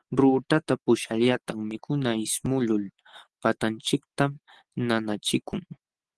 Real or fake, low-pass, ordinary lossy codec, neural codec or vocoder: real; 10.8 kHz; Opus, 16 kbps; none